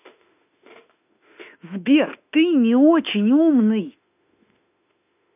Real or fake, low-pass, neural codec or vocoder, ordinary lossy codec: fake; 3.6 kHz; autoencoder, 48 kHz, 32 numbers a frame, DAC-VAE, trained on Japanese speech; none